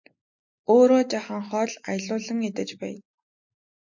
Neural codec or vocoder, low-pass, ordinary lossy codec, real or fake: none; 7.2 kHz; MP3, 48 kbps; real